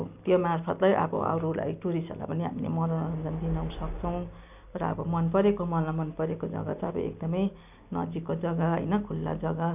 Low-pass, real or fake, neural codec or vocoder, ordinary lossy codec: 3.6 kHz; real; none; none